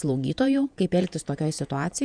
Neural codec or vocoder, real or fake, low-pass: vocoder, 48 kHz, 128 mel bands, Vocos; fake; 9.9 kHz